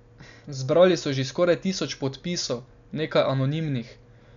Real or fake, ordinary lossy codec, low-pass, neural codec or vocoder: real; none; 7.2 kHz; none